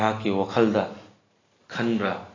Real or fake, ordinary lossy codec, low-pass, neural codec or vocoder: real; AAC, 32 kbps; 7.2 kHz; none